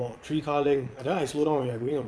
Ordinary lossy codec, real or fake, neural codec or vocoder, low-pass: none; fake; vocoder, 22.05 kHz, 80 mel bands, Vocos; none